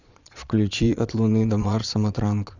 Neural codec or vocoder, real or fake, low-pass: vocoder, 22.05 kHz, 80 mel bands, Vocos; fake; 7.2 kHz